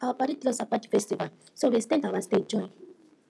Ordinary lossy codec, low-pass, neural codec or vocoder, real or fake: none; none; none; real